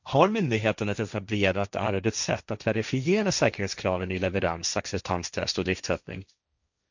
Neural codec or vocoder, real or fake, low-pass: codec, 16 kHz, 1.1 kbps, Voila-Tokenizer; fake; 7.2 kHz